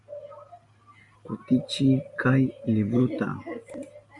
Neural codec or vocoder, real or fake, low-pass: none; real; 10.8 kHz